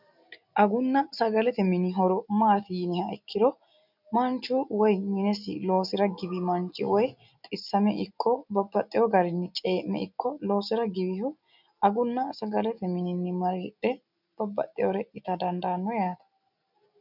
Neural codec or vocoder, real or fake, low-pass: none; real; 5.4 kHz